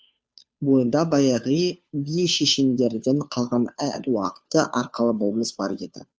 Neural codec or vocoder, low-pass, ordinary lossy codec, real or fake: codec, 16 kHz, 2 kbps, FunCodec, trained on Chinese and English, 25 frames a second; none; none; fake